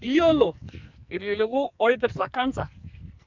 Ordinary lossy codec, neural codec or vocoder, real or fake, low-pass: MP3, 64 kbps; codec, 44.1 kHz, 2.6 kbps, SNAC; fake; 7.2 kHz